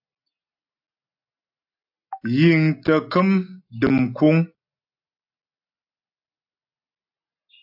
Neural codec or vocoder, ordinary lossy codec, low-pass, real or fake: none; AAC, 48 kbps; 5.4 kHz; real